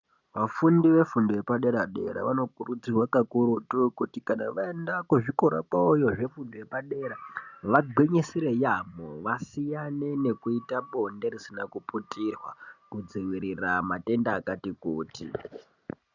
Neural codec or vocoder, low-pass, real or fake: none; 7.2 kHz; real